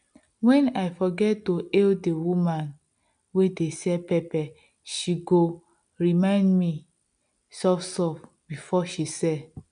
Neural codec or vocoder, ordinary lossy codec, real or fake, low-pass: none; none; real; 9.9 kHz